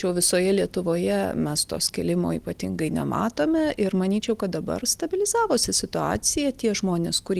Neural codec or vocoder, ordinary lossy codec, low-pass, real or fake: none; Opus, 24 kbps; 14.4 kHz; real